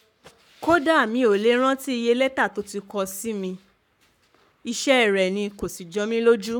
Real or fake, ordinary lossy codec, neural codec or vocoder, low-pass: fake; none; codec, 44.1 kHz, 7.8 kbps, Pupu-Codec; 19.8 kHz